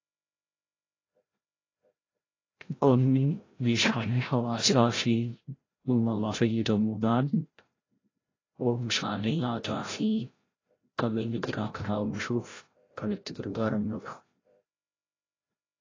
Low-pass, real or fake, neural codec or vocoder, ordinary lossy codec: 7.2 kHz; fake; codec, 16 kHz, 0.5 kbps, FreqCodec, larger model; AAC, 32 kbps